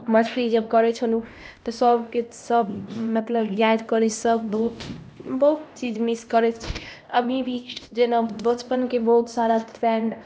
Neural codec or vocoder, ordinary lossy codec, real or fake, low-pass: codec, 16 kHz, 1 kbps, X-Codec, HuBERT features, trained on LibriSpeech; none; fake; none